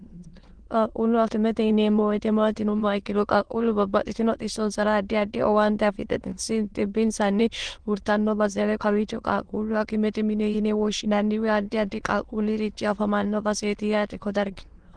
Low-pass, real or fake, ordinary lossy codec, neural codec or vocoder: 9.9 kHz; fake; Opus, 16 kbps; autoencoder, 22.05 kHz, a latent of 192 numbers a frame, VITS, trained on many speakers